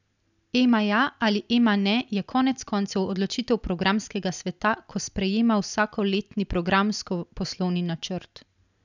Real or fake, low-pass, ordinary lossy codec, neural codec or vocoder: real; 7.2 kHz; none; none